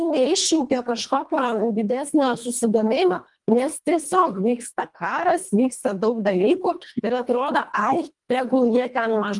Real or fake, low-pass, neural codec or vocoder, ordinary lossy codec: fake; 10.8 kHz; codec, 24 kHz, 1.5 kbps, HILCodec; Opus, 32 kbps